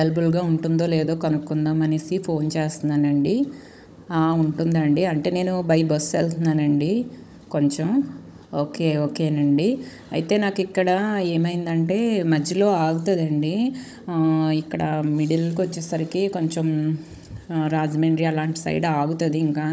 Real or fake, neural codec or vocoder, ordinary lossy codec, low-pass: fake; codec, 16 kHz, 16 kbps, FunCodec, trained on Chinese and English, 50 frames a second; none; none